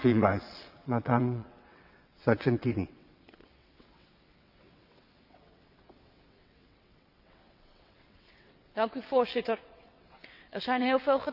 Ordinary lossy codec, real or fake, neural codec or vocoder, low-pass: none; fake; vocoder, 22.05 kHz, 80 mel bands, WaveNeXt; 5.4 kHz